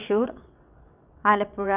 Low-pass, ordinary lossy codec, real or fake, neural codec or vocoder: 3.6 kHz; none; fake; vocoder, 22.05 kHz, 80 mel bands, WaveNeXt